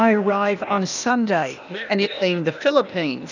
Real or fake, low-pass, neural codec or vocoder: fake; 7.2 kHz; codec, 16 kHz, 0.8 kbps, ZipCodec